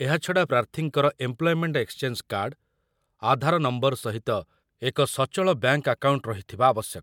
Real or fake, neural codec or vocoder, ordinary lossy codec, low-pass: real; none; MP3, 96 kbps; 19.8 kHz